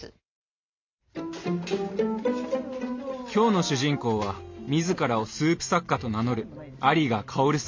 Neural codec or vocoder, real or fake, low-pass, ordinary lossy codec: none; real; 7.2 kHz; MP3, 48 kbps